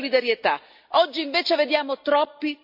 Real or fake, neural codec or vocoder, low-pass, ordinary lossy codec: real; none; 5.4 kHz; AAC, 48 kbps